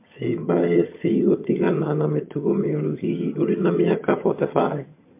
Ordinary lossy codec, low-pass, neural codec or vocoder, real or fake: MP3, 24 kbps; 3.6 kHz; vocoder, 22.05 kHz, 80 mel bands, HiFi-GAN; fake